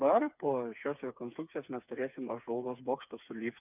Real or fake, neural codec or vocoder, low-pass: fake; codec, 16 kHz, 4 kbps, FreqCodec, smaller model; 3.6 kHz